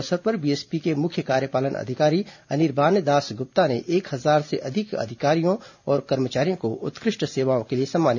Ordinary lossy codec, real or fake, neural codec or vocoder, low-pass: none; real; none; 7.2 kHz